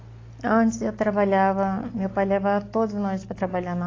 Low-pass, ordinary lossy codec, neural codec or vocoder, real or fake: 7.2 kHz; AAC, 32 kbps; none; real